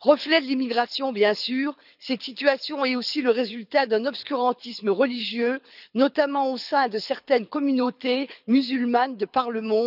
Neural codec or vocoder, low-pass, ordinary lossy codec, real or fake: codec, 24 kHz, 6 kbps, HILCodec; 5.4 kHz; none; fake